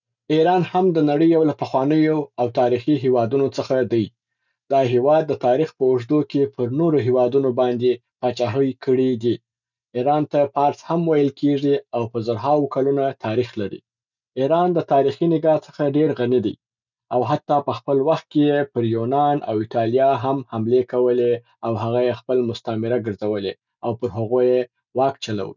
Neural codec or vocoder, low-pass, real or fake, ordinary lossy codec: none; 7.2 kHz; real; none